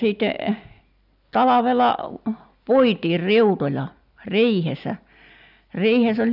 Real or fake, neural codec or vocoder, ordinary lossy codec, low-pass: real; none; none; 5.4 kHz